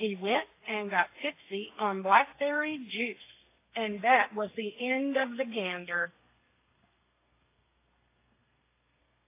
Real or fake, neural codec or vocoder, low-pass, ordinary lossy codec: fake; codec, 32 kHz, 1.9 kbps, SNAC; 3.6 kHz; AAC, 24 kbps